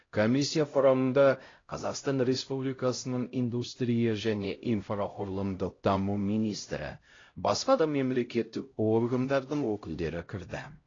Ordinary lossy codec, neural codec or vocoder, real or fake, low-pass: AAC, 32 kbps; codec, 16 kHz, 0.5 kbps, X-Codec, HuBERT features, trained on LibriSpeech; fake; 7.2 kHz